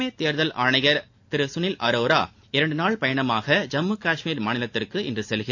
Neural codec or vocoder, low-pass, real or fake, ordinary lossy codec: none; 7.2 kHz; real; AAC, 48 kbps